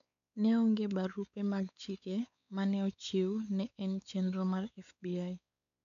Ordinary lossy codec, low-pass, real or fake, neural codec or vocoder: none; 7.2 kHz; fake; codec, 16 kHz, 4 kbps, X-Codec, WavLM features, trained on Multilingual LibriSpeech